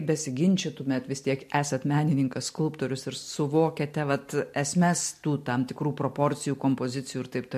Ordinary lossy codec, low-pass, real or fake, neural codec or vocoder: MP3, 64 kbps; 14.4 kHz; real; none